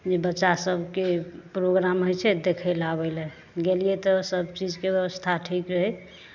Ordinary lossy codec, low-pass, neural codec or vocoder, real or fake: none; 7.2 kHz; none; real